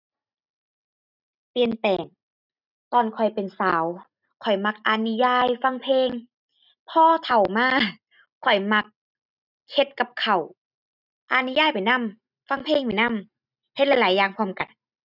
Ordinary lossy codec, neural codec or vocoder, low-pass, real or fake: none; none; 5.4 kHz; real